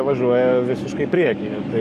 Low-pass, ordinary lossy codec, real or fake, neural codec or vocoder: 14.4 kHz; MP3, 96 kbps; fake; codec, 44.1 kHz, 7.8 kbps, DAC